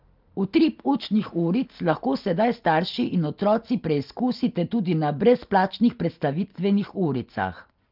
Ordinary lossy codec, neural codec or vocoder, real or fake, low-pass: Opus, 32 kbps; none; real; 5.4 kHz